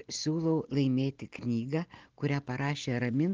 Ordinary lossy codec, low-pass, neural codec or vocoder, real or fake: Opus, 16 kbps; 7.2 kHz; none; real